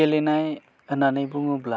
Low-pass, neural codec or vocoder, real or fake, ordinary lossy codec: none; none; real; none